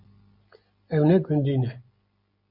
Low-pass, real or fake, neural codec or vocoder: 5.4 kHz; real; none